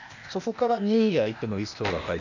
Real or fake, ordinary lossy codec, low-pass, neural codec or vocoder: fake; none; 7.2 kHz; codec, 16 kHz, 0.8 kbps, ZipCodec